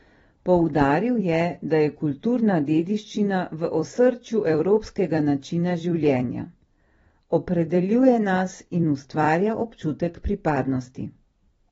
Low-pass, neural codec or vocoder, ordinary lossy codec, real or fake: 19.8 kHz; vocoder, 44.1 kHz, 128 mel bands every 256 samples, BigVGAN v2; AAC, 24 kbps; fake